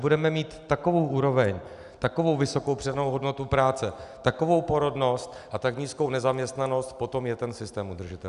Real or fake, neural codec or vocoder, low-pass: real; none; 10.8 kHz